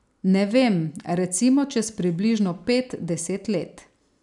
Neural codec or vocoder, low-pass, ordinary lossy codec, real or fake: none; 10.8 kHz; none; real